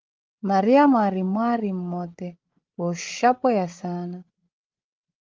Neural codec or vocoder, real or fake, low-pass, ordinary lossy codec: codec, 16 kHz, 16 kbps, FreqCodec, larger model; fake; 7.2 kHz; Opus, 24 kbps